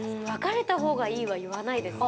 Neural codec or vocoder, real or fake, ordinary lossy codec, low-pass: none; real; none; none